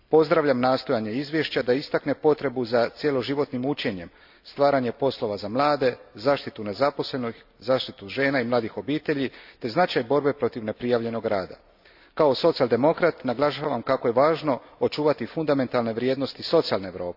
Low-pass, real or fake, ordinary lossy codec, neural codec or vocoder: 5.4 kHz; real; AAC, 48 kbps; none